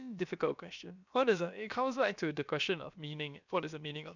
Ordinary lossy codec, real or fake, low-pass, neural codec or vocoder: none; fake; 7.2 kHz; codec, 16 kHz, about 1 kbps, DyCAST, with the encoder's durations